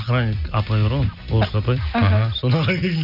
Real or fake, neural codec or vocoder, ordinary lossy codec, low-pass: real; none; none; 5.4 kHz